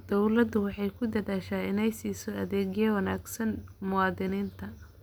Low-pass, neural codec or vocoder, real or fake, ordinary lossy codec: none; none; real; none